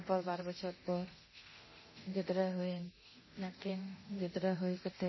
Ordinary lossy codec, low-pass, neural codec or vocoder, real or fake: MP3, 24 kbps; 7.2 kHz; codec, 24 kHz, 0.9 kbps, DualCodec; fake